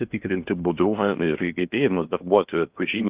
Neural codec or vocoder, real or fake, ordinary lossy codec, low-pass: codec, 16 kHz in and 24 kHz out, 0.8 kbps, FocalCodec, streaming, 65536 codes; fake; Opus, 32 kbps; 3.6 kHz